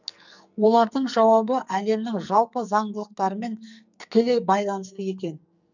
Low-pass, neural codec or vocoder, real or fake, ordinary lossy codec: 7.2 kHz; codec, 44.1 kHz, 2.6 kbps, SNAC; fake; none